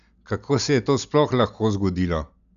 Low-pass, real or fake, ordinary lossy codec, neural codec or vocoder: 7.2 kHz; real; none; none